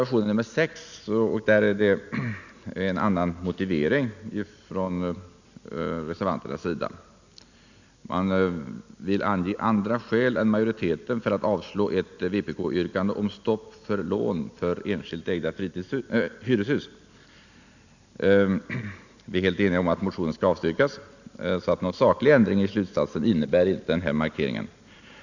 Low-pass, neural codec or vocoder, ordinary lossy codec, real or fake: 7.2 kHz; none; none; real